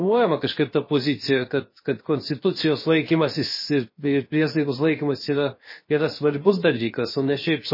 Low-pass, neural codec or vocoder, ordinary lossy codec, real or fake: 5.4 kHz; codec, 16 kHz, 0.7 kbps, FocalCodec; MP3, 24 kbps; fake